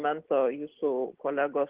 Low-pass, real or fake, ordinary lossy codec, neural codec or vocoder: 3.6 kHz; real; Opus, 16 kbps; none